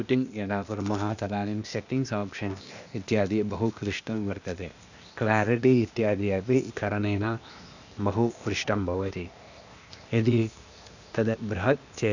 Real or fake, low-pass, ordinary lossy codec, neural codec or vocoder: fake; 7.2 kHz; none; codec, 16 kHz, 0.8 kbps, ZipCodec